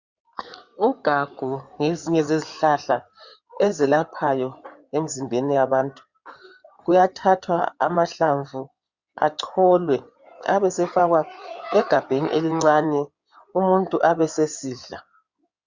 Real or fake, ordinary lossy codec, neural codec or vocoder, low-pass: fake; Opus, 64 kbps; codec, 16 kHz in and 24 kHz out, 2.2 kbps, FireRedTTS-2 codec; 7.2 kHz